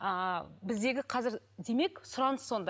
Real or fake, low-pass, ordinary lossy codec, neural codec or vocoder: real; none; none; none